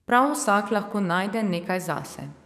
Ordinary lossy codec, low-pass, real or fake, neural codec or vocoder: none; 14.4 kHz; fake; codec, 44.1 kHz, 7.8 kbps, DAC